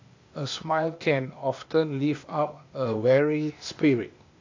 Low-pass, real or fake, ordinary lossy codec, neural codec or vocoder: 7.2 kHz; fake; AAC, 48 kbps; codec, 16 kHz, 0.8 kbps, ZipCodec